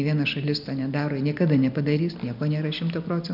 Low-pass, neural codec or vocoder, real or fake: 5.4 kHz; none; real